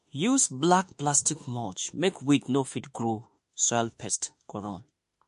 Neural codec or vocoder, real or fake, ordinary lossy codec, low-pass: autoencoder, 48 kHz, 32 numbers a frame, DAC-VAE, trained on Japanese speech; fake; MP3, 48 kbps; 14.4 kHz